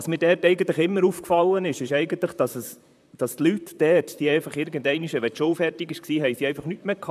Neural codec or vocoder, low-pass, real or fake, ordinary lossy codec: vocoder, 44.1 kHz, 128 mel bands, Pupu-Vocoder; 14.4 kHz; fake; none